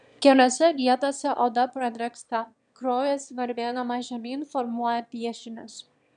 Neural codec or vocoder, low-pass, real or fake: autoencoder, 22.05 kHz, a latent of 192 numbers a frame, VITS, trained on one speaker; 9.9 kHz; fake